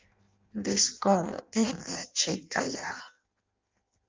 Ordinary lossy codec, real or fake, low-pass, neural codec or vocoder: Opus, 24 kbps; fake; 7.2 kHz; codec, 16 kHz in and 24 kHz out, 0.6 kbps, FireRedTTS-2 codec